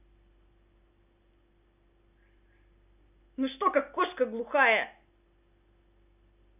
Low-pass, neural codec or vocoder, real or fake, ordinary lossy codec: 3.6 kHz; none; real; none